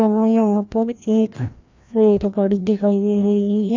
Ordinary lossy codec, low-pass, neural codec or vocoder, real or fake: AAC, 48 kbps; 7.2 kHz; codec, 16 kHz, 1 kbps, FreqCodec, larger model; fake